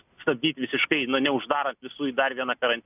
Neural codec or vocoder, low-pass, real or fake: none; 3.6 kHz; real